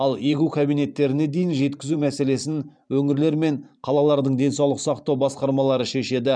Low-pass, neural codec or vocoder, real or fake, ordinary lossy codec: 9.9 kHz; vocoder, 44.1 kHz, 128 mel bands every 512 samples, BigVGAN v2; fake; none